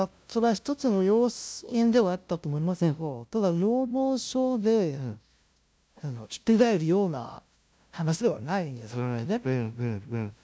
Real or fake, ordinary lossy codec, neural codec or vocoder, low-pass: fake; none; codec, 16 kHz, 0.5 kbps, FunCodec, trained on LibriTTS, 25 frames a second; none